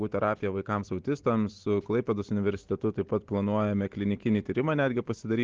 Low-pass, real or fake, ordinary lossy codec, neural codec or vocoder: 7.2 kHz; real; Opus, 16 kbps; none